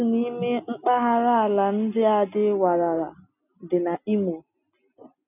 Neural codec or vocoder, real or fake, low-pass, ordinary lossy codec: none; real; 3.6 kHz; none